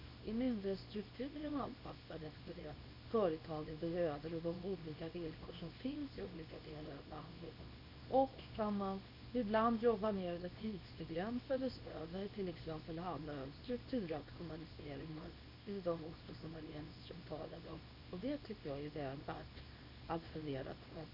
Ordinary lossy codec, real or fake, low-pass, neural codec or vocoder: none; fake; 5.4 kHz; codec, 24 kHz, 0.9 kbps, WavTokenizer, small release